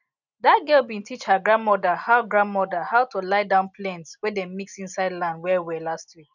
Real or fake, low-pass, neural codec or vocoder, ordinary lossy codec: real; 7.2 kHz; none; none